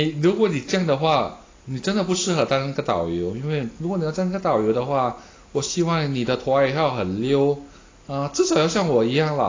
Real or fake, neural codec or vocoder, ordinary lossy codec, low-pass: real; none; AAC, 32 kbps; 7.2 kHz